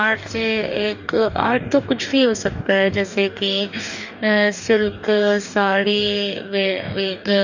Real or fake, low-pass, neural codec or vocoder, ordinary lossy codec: fake; 7.2 kHz; codec, 44.1 kHz, 2.6 kbps, DAC; none